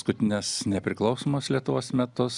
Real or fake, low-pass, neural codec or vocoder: real; 10.8 kHz; none